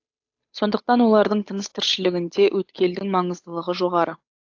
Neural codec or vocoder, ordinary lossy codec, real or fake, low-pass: codec, 16 kHz, 8 kbps, FunCodec, trained on Chinese and English, 25 frames a second; AAC, 48 kbps; fake; 7.2 kHz